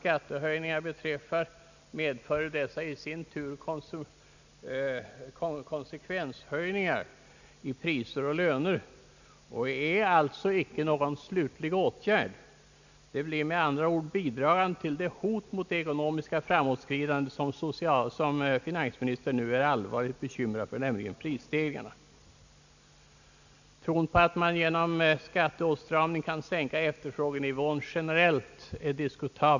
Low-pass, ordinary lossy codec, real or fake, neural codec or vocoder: 7.2 kHz; none; real; none